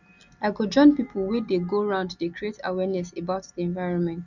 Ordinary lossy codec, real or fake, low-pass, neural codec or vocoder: none; real; 7.2 kHz; none